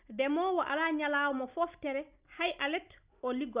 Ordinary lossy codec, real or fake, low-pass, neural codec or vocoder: Opus, 64 kbps; real; 3.6 kHz; none